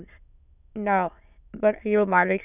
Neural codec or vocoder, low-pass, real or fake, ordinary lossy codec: autoencoder, 22.05 kHz, a latent of 192 numbers a frame, VITS, trained on many speakers; 3.6 kHz; fake; none